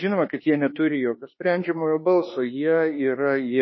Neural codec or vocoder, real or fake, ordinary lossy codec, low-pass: autoencoder, 48 kHz, 32 numbers a frame, DAC-VAE, trained on Japanese speech; fake; MP3, 24 kbps; 7.2 kHz